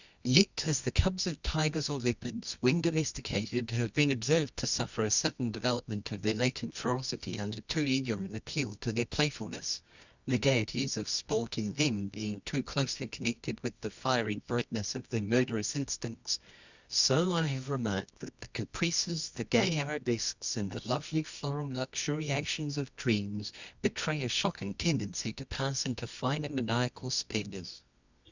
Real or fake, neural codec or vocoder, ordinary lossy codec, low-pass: fake; codec, 24 kHz, 0.9 kbps, WavTokenizer, medium music audio release; Opus, 64 kbps; 7.2 kHz